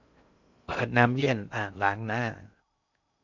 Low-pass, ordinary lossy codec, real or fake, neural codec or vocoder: 7.2 kHz; none; fake; codec, 16 kHz in and 24 kHz out, 0.6 kbps, FocalCodec, streaming, 2048 codes